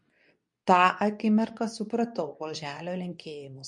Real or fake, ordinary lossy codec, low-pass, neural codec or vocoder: fake; MP3, 48 kbps; 10.8 kHz; codec, 24 kHz, 0.9 kbps, WavTokenizer, medium speech release version 2